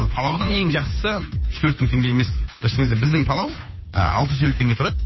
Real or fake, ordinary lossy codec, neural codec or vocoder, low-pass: fake; MP3, 24 kbps; codec, 16 kHz, 2 kbps, FunCodec, trained on Chinese and English, 25 frames a second; 7.2 kHz